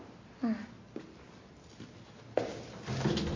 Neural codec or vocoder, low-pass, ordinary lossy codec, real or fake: none; 7.2 kHz; MP3, 32 kbps; real